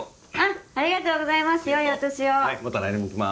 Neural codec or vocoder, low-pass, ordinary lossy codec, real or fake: none; none; none; real